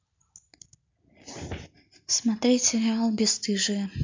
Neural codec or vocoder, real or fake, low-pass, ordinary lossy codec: none; real; 7.2 kHz; MP3, 64 kbps